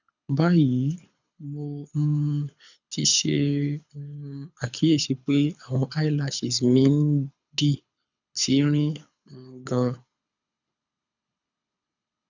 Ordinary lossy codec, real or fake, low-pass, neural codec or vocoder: none; fake; 7.2 kHz; codec, 24 kHz, 6 kbps, HILCodec